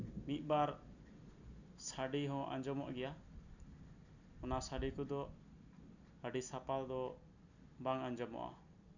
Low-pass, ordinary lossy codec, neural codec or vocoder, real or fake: 7.2 kHz; none; none; real